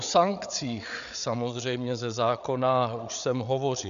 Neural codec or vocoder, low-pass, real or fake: codec, 16 kHz, 8 kbps, FreqCodec, larger model; 7.2 kHz; fake